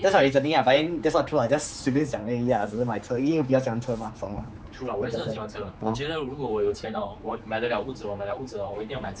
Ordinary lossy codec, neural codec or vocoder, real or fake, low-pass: none; codec, 16 kHz, 4 kbps, X-Codec, HuBERT features, trained on general audio; fake; none